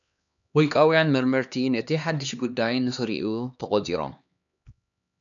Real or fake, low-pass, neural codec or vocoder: fake; 7.2 kHz; codec, 16 kHz, 2 kbps, X-Codec, HuBERT features, trained on LibriSpeech